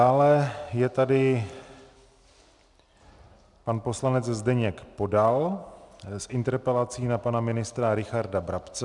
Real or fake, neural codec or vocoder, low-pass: real; none; 10.8 kHz